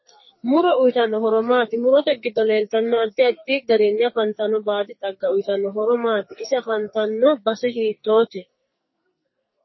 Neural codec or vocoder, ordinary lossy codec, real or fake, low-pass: codec, 44.1 kHz, 2.6 kbps, SNAC; MP3, 24 kbps; fake; 7.2 kHz